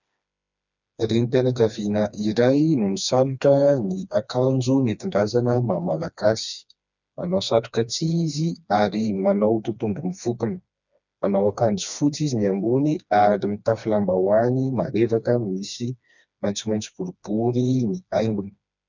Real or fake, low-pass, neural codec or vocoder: fake; 7.2 kHz; codec, 16 kHz, 2 kbps, FreqCodec, smaller model